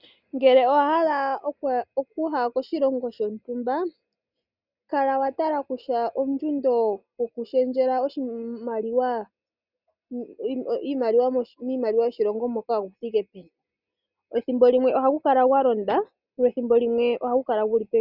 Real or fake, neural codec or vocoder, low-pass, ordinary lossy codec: real; none; 5.4 kHz; Opus, 64 kbps